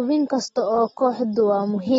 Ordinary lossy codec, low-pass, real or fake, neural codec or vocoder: AAC, 24 kbps; 19.8 kHz; real; none